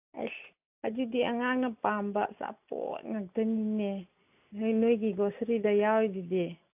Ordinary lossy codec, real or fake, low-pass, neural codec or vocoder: AAC, 32 kbps; real; 3.6 kHz; none